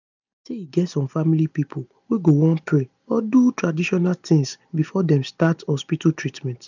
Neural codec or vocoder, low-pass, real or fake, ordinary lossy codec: none; 7.2 kHz; real; none